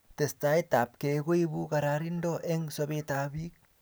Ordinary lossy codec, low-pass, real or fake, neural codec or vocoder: none; none; real; none